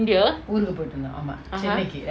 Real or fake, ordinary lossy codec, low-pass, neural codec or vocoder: real; none; none; none